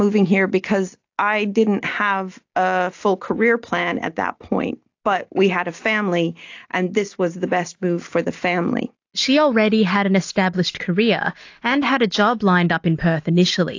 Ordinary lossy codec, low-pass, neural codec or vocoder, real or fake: AAC, 48 kbps; 7.2 kHz; none; real